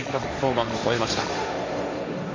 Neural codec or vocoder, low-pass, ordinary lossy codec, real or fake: codec, 16 kHz, 1.1 kbps, Voila-Tokenizer; 7.2 kHz; AAC, 32 kbps; fake